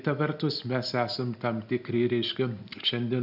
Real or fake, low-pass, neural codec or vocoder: real; 5.4 kHz; none